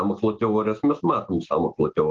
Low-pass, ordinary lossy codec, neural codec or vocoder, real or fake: 7.2 kHz; Opus, 16 kbps; none; real